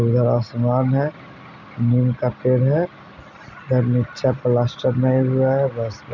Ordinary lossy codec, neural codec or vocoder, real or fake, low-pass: none; none; real; 7.2 kHz